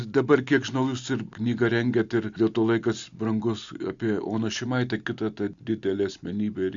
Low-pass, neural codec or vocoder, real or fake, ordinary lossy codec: 7.2 kHz; none; real; Opus, 64 kbps